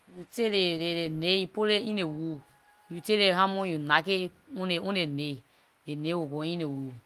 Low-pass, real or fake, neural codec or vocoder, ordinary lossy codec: 14.4 kHz; real; none; Opus, 32 kbps